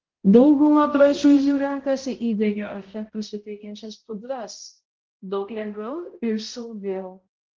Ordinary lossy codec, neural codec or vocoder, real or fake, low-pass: Opus, 16 kbps; codec, 16 kHz, 0.5 kbps, X-Codec, HuBERT features, trained on balanced general audio; fake; 7.2 kHz